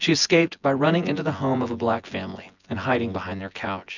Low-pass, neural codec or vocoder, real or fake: 7.2 kHz; vocoder, 24 kHz, 100 mel bands, Vocos; fake